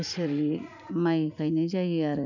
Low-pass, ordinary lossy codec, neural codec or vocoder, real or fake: 7.2 kHz; none; vocoder, 44.1 kHz, 80 mel bands, Vocos; fake